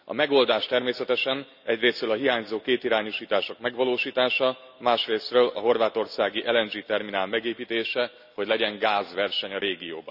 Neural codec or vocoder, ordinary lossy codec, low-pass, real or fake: none; none; 5.4 kHz; real